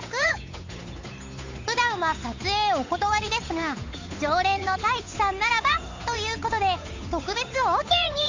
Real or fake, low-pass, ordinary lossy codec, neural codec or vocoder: fake; 7.2 kHz; AAC, 48 kbps; codec, 16 kHz, 8 kbps, FunCodec, trained on Chinese and English, 25 frames a second